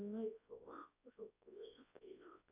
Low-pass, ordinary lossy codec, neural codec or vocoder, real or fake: 3.6 kHz; AAC, 24 kbps; codec, 24 kHz, 0.9 kbps, WavTokenizer, large speech release; fake